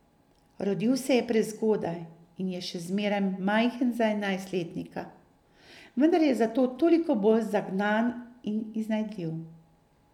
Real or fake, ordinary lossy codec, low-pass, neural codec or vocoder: real; none; 19.8 kHz; none